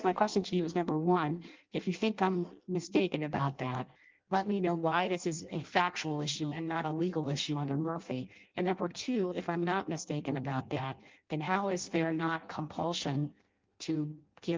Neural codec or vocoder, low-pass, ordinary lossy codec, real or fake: codec, 16 kHz in and 24 kHz out, 0.6 kbps, FireRedTTS-2 codec; 7.2 kHz; Opus, 32 kbps; fake